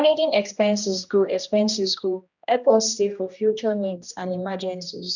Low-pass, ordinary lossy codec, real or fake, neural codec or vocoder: 7.2 kHz; none; fake; codec, 16 kHz, 1 kbps, X-Codec, HuBERT features, trained on general audio